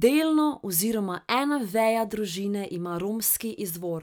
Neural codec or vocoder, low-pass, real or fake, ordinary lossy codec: none; none; real; none